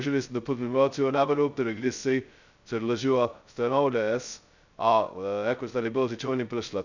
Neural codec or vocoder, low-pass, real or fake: codec, 16 kHz, 0.2 kbps, FocalCodec; 7.2 kHz; fake